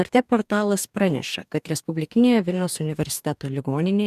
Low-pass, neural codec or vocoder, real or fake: 14.4 kHz; codec, 44.1 kHz, 2.6 kbps, DAC; fake